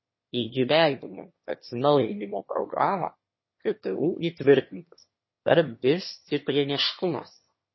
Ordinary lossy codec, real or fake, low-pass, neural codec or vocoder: MP3, 24 kbps; fake; 7.2 kHz; autoencoder, 22.05 kHz, a latent of 192 numbers a frame, VITS, trained on one speaker